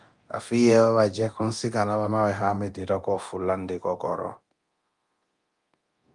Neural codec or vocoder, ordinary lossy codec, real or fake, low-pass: codec, 24 kHz, 0.9 kbps, DualCodec; Opus, 32 kbps; fake; 10.8 kHz